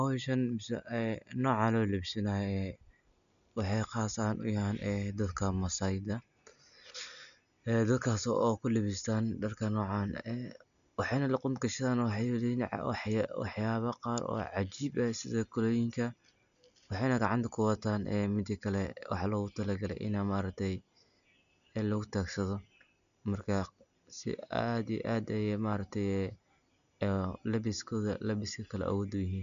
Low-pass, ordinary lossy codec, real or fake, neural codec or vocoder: 7.2 kHz; none; real; none